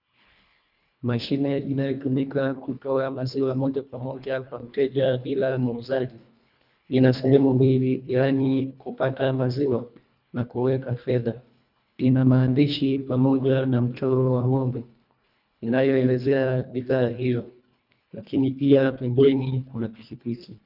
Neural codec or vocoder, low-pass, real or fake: codec, 24 kHz, 1.5 kbps, HILCodec; 5.4 kHz; fake